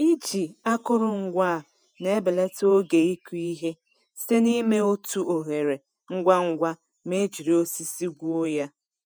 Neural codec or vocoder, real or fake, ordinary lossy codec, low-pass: vocoder, 48 kHz, 128 mel bands, Vocos; fake; none; none